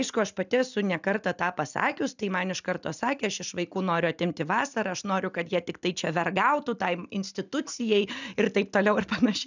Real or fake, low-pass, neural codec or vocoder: real; 7.2 kHz; none